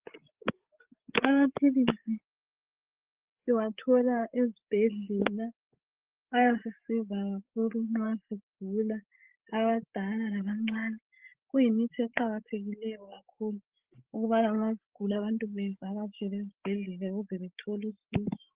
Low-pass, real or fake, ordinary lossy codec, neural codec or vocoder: 3.6 kHz; fake; Opus, 16 kbps; codec, 16 kHz, 16 kbps, FreqCodec, larger model